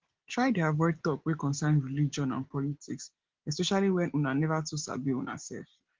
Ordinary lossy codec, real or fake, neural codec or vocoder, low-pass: Opus, 16 kbps; real; none; 7.2 kHz